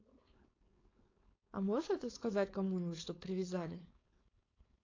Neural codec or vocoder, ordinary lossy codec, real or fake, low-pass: codec, 16 kHz, 4.8 kbps, FACodec; AAC, 32 kbps; fake; 7.2 kHz